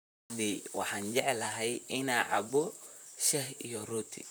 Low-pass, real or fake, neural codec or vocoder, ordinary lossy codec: none; fake; vocoder, 44.1 kHz, 128 mel bands, Pupu-Vocoder; none